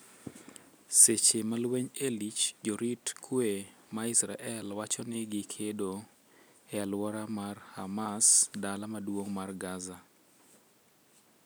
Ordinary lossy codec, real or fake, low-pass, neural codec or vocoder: none; real; none; none